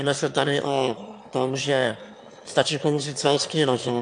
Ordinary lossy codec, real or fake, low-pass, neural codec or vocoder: AAC, 64 kbps; fake; 9.9 kHz; autoencoder, 22.05 kHz, a latent of 192 numbers a frame, VITS, trained on one speaker